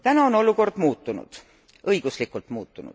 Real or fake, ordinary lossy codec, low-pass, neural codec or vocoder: real; none; none; none